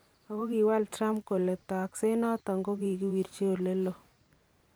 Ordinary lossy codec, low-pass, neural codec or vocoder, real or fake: none; none; vocoder, 44.1 kHz, 128 mel bands every 512 samples, BigVGAN v2; fake